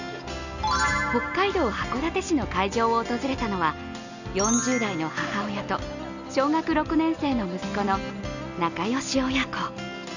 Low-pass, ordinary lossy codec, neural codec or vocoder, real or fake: 7.2 kHz; none; none; real